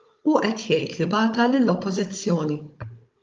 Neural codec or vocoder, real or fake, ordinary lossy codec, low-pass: codec, 16 kHz, 16 kbps, FunCodec, trained on Chinese and English, 50 frames a second; fake; Opus, 32 kbps; 7.2 kHz